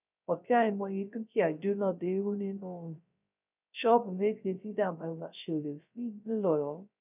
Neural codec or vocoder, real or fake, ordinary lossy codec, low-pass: codec, 16 kHz, 0.3 kbps, FocalCodec; fake; none; 3.6 kHz